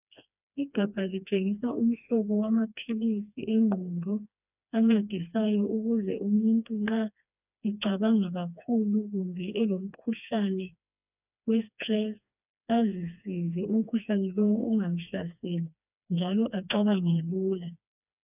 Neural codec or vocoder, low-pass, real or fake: codec, 16 kHz, 2 kbps, FreqCodec, smaller model; 3.6 kHz; fake